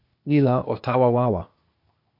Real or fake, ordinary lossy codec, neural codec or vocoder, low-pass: fake; AAC, 48 kbps; codec, 16 kHz, 0.8 kbps, ZipCodec; 5.4 kHz